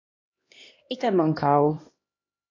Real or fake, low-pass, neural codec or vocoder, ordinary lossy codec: fake; 7.2 kHz; codec, 16 kHz, 1 kbps, X-Codec, HuBERT features, trained on LibriSpeech; AAC, 32 kbps